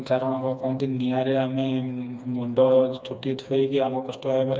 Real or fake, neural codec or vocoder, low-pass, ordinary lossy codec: fake; codec, 16 kHz, 2 kbps, FreqCodec, smaller model; none; none